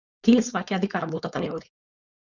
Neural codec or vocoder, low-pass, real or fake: codec, 16 kHz, 4.8 kbps, FACodec; 7.2 kHz; fake